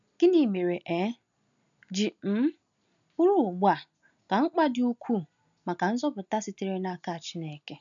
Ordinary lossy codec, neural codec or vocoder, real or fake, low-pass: none; none; real; 7.2 kHz